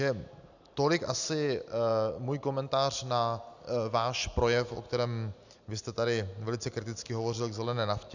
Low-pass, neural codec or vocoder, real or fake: 7.2 kHz; none; real